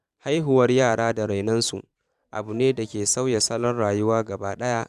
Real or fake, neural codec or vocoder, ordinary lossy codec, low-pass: fake; vocoder, 44.1 kHz, 128 mel bands every 512 samples, BigVGAN v2; none; 14.4 kHz